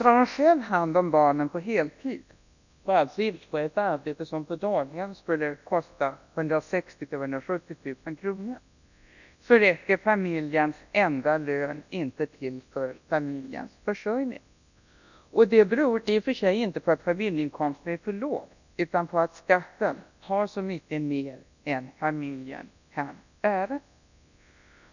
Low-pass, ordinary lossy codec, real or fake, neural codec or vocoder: 7.2 kHz; none; fake; codec, 24 kHz, 0.9 kbps, WavTokenizer, large speech release